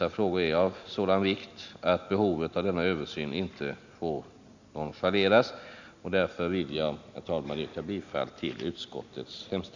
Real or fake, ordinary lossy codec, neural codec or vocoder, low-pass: real; none; none; 7.2 kHz